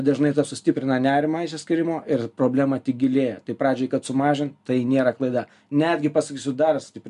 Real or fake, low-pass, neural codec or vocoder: real; 10.8 kHz; none